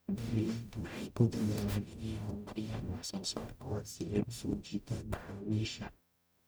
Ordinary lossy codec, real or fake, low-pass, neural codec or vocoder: none; fake; none; codec, 44.1 kHz, 0.9 kbps, DAC